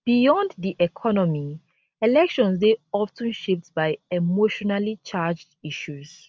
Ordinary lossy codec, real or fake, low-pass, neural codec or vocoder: none; real; none; none